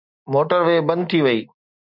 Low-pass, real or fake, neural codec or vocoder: 5.4 kHz; real; none